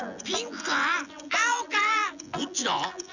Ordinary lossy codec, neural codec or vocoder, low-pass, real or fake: none; vocoder, 24 kHz, 100 mel bands, Vocos; 7.2 kHz; fake